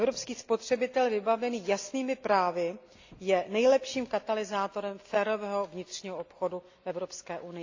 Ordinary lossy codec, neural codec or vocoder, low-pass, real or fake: AAC, 48 kbps; none; 7.2 kHz; real